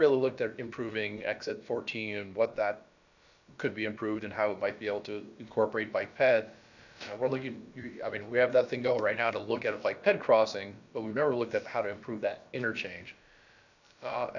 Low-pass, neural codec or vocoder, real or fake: 7.2 kHz; codec, 16 kHz, about 1 kbps, DyCAST, with the encoder's durations; fake